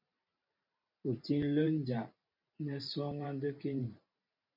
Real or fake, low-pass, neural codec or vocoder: fake; 5.4 kHz; vocoder, 44.1 kHz, 128 mel bands every 512 samples, BigVGAN v2